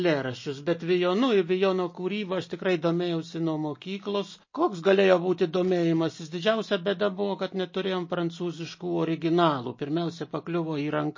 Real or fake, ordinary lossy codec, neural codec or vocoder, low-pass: real; MP3, 32 kbps; none; 7.2 kHz